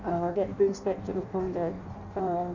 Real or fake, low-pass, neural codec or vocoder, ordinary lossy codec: fake; 7.2 kHz; codec, 16 kHz in and 24 kHz out, 0.6 kbps, FireRedTTS-2 codec; none